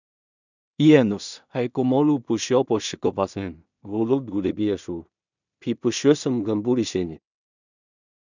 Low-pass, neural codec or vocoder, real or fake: 7.2 kHz; codec, 16 kHz in and 24 kHz out, 0.4 kbps, LongCat-Audio-Codec, two codebook decoder; fake